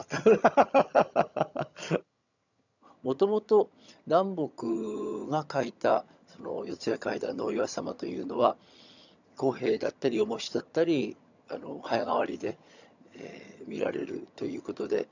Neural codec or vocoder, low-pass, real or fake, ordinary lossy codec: vocoder, 22.05 kHz, 80 mel bands, HiFi-GAN; 7.2 kHz; fake; none